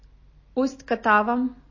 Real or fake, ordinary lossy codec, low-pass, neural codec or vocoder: real; MP3, 32 kbps; 7.2 kHz; none